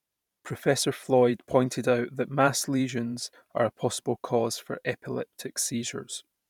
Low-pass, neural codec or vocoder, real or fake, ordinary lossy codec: 19.8 kHz; vocoder, 44.1 kHz, 128 mel bands every 512 samples, BigVGAN v2; fake; none